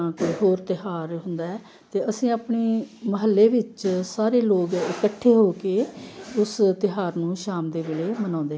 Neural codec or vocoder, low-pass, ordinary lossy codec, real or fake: none; none; none; real